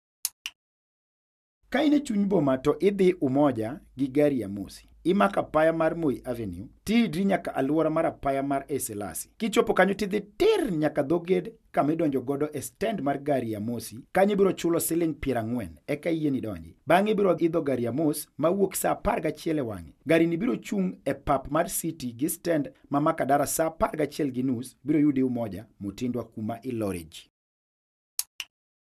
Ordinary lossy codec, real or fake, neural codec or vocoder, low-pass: none; real; none; 14.4 kHz